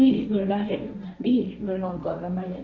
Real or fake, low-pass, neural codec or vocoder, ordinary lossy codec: fake; none; codec, 16 kHz, 1.1 kbps, Voila-Tokenizer; none